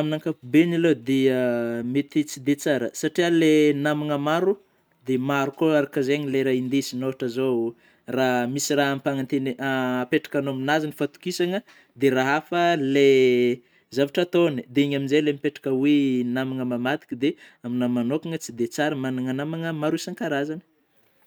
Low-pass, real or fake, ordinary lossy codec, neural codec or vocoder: none; real; none; none